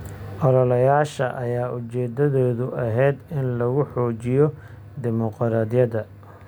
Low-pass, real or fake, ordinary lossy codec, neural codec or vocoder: none; real; none; none